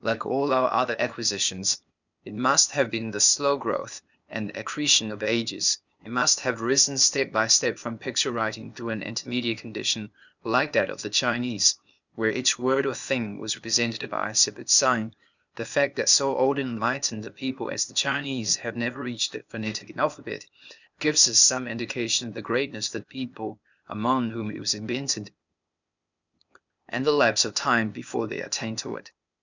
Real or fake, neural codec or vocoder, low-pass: fake; codec, 16 kHz, 0.8 kbps, ZipCodec; 7.2 kHz